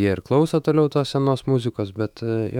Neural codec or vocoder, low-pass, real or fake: autoencoder, 48 kHz, 128 numbers a frame, DAC-VAE, trained on Japanese speech; 19.8 kHz; fake